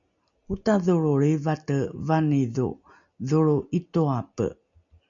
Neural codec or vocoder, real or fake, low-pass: none; real; 7.2 kHz